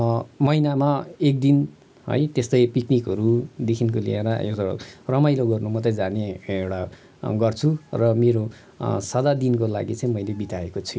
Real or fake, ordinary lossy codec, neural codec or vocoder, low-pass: real; none; none; none